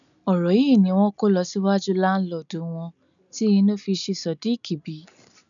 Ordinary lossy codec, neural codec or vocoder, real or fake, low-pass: MP3, 96 kbps; none; real; 7.2 kHz